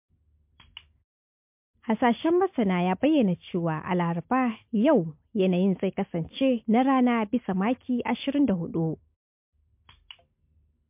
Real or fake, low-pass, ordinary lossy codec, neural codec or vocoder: real; 3.6 kHz; MP3, 32 kbps; none